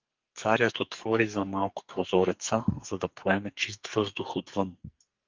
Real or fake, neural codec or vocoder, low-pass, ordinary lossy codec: fake; codec, 44.1 kHz, 2.6 kbps, SNAC; 7.2 kHz; Opus, 32 kbps